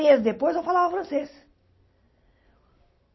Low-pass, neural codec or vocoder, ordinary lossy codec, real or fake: 7.2 kHz; none; MP3, 24 kbps; real